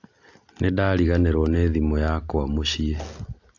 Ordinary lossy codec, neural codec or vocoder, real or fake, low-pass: AAC, 48 kbps; none; real; 7.2 kHz